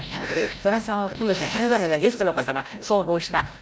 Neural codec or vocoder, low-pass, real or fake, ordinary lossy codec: codec, 16 kHz, 0.5 kbps, FreqCodec, larger model; none; fake; none